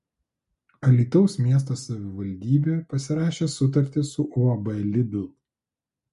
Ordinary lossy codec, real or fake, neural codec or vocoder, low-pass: MP3, 48 kbps; real; none; 14.4 kHz